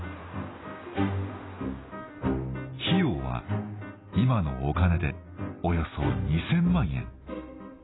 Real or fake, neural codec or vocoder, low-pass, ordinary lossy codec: real; none; 7.2 kHz; AAC, 16 kbps